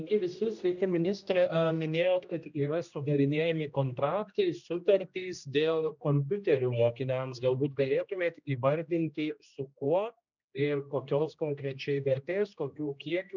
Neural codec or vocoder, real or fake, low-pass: codec, 16 kHz, 1 kbps, X-Codec, HuBERT features, trained on general audio; fake; 7.2 kHz